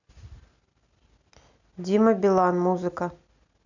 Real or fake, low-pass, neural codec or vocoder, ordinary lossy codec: real; 7.2 kHz; none; none